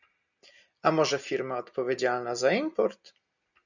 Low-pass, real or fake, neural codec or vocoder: 7.2 kHz; real; none